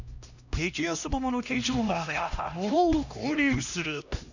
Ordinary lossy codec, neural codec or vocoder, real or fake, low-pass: none; codec, 16 kHz, 1 kbps, X-Codec, HuBERT features, trained on LibriSpeech; fake; 7.2 kHz